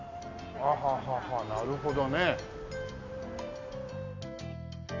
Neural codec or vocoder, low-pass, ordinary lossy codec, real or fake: none; 7.2 kHz; Opus, 64 kbps; real